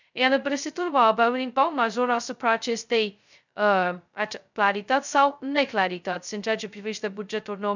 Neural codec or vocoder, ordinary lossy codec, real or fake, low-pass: codec, 16 kHz, 0.2 kbps, FocalCodec; none; fake; 7.2 kHz